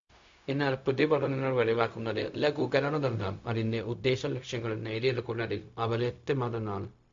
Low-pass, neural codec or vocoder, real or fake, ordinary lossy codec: 7.2 kHz; codec, 16 kHz, 0.4 kbps, LongCat-Audio-Codec; fake; MP3, 48 kbps